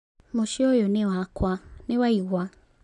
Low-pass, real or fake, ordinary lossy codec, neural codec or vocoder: 10.8 kHz; real; none; none